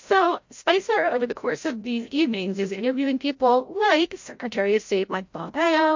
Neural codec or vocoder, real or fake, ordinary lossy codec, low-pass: codec, 16 kHz, 0.5 kbps, FreqCodec, larger model; fake; MP3, 48 kbps; 7.2 kHz